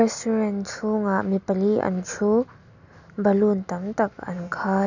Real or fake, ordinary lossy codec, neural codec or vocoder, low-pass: real; none; none; 7.2 kHz